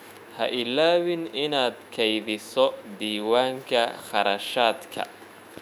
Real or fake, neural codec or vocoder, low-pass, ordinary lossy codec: fake; autoencoder, 48 kHz, 128 numbers a frame, DAC-VAE, trained on Japanese speech; 19.8 kHz; none